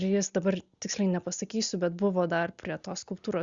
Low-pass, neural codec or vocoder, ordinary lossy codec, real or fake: 7.2 kHz; none; Opus, 64 kbps; real